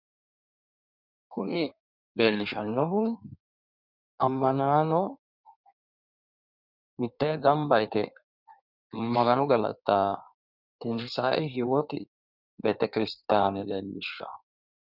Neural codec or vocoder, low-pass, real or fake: codec, 16 kHz in and 24 kHz out, 1.1 kbps, FireRedTTS-2 codec; 5.4 kHz; fake